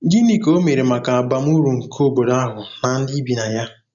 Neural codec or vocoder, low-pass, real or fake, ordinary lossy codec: none; 7.2 kHz; real; none